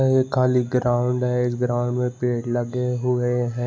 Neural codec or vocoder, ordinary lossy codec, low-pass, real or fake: none; none; none; real